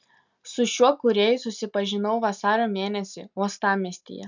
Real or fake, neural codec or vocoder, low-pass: real; none; 7.2 kHz